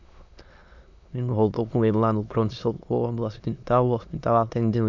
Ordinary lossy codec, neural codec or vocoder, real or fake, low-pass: MP3, 64 kbps; autoencoder, 22.05 kHz, a latent of 192 numbers a frame, VITS, trained on many speakers; fake; 7.2 kHz